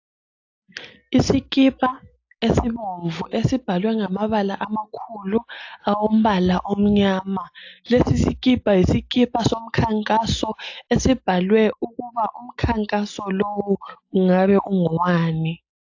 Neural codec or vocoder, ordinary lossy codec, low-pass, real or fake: none; AAC, 48 kbps; 7.2 kHz; real